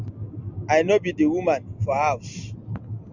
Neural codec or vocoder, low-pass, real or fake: none; 7.2 kHz; real